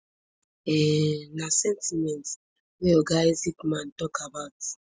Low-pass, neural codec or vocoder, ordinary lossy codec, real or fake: none; none; none; real